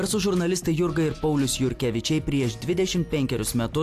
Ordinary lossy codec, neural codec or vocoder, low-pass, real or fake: AAC, 64 kbps; none; 14.4 kHz; real